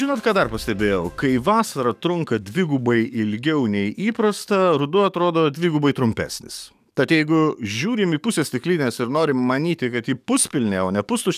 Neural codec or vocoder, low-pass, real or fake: codec, 44.1 kHz, 7.8 kbps, DAC; 14.4 kHz; fake